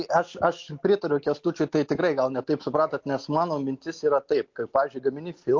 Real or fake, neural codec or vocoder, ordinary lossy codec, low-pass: real; none; MP3, 48 kbps; 7.2 kHz